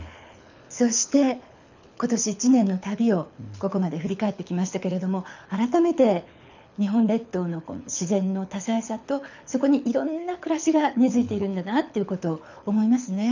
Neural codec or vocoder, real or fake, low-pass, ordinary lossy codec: codec, 24 kHz, 6 kbps, HILCodec; fake; 7.2 kHz; none